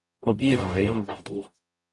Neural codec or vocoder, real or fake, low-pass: codec, 44.1 kHz, 0.9 kbps, DAC; fake; 10.8 kHz